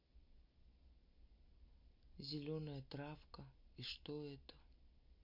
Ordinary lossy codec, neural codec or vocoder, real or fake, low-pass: MP3, 32 kbps; none; real; 5.4 kHz